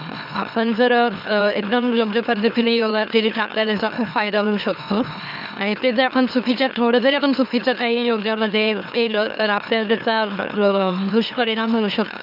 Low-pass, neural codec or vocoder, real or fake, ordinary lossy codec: 5.4 kHz; autoencoder, 44.1 kHz, a latent of 192 numbers a frame, MeloTTS; fake; none